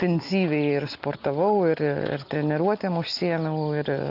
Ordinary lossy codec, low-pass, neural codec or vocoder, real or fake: Opus, 24 kbps; 5.4 kHz; none; real